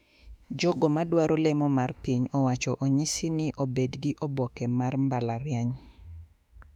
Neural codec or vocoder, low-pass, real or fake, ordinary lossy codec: autoencoder, 48 kHz, 32 numbers a frame, DAC-VAE, trained on Japanese speech; 19.8 kHz; fake; none